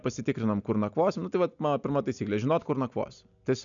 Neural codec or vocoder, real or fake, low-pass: none; real; 7.2 kHz